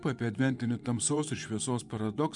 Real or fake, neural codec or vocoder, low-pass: fake; vocoder, 24 kHz, 100 mel bands, Vocos; 10.8 kHz